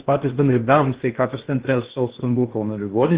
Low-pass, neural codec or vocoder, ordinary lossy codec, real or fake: 3.6 kHz; codec, 16 kHz in and 24 kHz out, 0.6 kbps, FocalCodec, streaming, 2048 codes; Opus, 16 kbps; fake